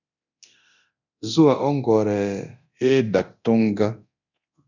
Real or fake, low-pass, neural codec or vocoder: fake; 7.2 kHz; codec, 24 kHz, 0.9 kbps, DualCodec